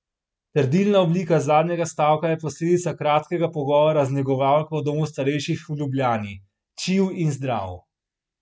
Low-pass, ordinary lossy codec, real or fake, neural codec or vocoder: none; none; real; none